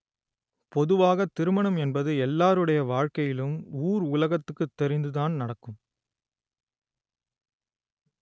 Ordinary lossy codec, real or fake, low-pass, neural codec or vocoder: none; real; none; none